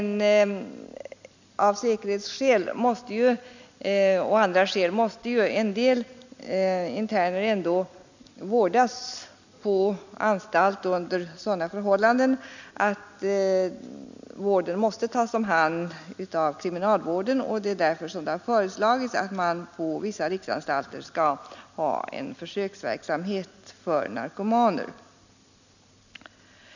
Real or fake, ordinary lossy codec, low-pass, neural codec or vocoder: real; none; 7.2 kHz; none